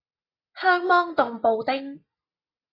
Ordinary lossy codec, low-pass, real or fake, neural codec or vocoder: AAC, 24 kbps; 5.4 kHz; real; none